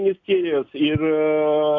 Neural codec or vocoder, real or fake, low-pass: none; real; 7.2 kHz